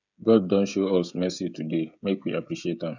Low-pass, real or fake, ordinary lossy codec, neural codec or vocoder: 7.2 kHz; fake; none; codec, 16 kHz, 16 kbps, FreqCodec, smaller model